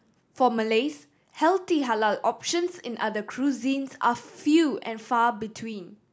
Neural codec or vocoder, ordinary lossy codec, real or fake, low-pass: none; none; real; none